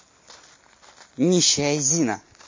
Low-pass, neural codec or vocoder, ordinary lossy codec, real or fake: 7.2 kHz; none; MP3, 32 kbps; real